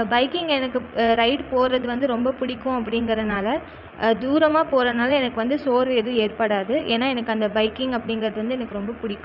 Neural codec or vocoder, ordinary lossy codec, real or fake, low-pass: vocoder, 44.1 kHz, 80 mel bands, Vocos; none; fake; 5.4 kHz